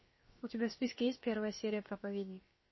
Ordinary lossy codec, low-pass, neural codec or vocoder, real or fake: MP3, 24 kbps; 7.2 kHz; codec, 16 kHz, about 1 kbps, DyCAST, with the encoder's durations; fake